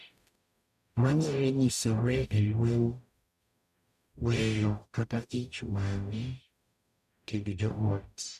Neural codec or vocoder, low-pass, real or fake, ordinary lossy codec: codec, 44.1 kHz, 0.9 kbps, DAC; 14.4 kHz; fake; none